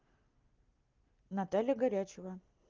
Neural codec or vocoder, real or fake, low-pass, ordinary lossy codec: none; real; 7.2 kHz; Opus, 32 kbps